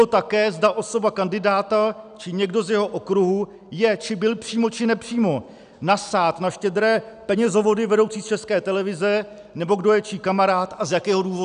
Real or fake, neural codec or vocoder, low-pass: real; none; 9.9 kHz